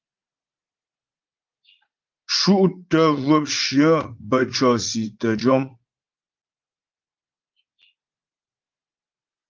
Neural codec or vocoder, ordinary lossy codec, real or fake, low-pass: vocoder, 44.1 kHz, 80 mel bands, Vocos; Opus, 24 kbps; fake; 7.2 kHz